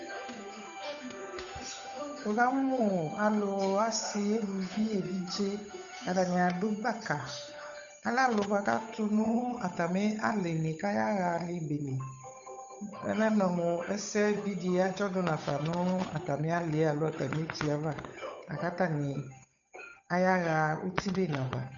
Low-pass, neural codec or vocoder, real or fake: 7.2 kHz; codec, 16 kHz, 8 kbps, FunCodec, trained on Chinese and English, 25 frames a second; fake